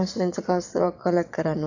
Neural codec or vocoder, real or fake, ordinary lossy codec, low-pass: vocoder, 22.05 kHz, 80 mel bands, WaveNeXt; fake; none; 7.2 kHz